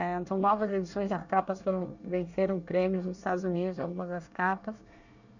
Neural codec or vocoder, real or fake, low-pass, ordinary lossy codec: codec, 24 kHz, 1 kbps, SNAC; fake; 7.2 kHz; none